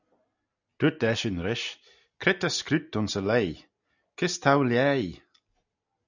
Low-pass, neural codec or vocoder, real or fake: 7.2 kHz; none; real